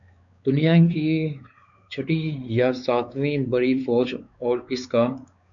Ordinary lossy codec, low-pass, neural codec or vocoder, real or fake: MP3, 96 kbps; 7.2 kHz; codec, 16 kHz, 4 kbps, X-Codec, WavLM features, trained on Multilingual LibriSpeech; fake